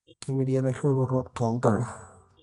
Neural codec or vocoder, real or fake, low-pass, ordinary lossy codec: codec, 24 kHz, 0.9 kbps, WavTokenizer, medium music audio release; fake; 10.8 kHz; none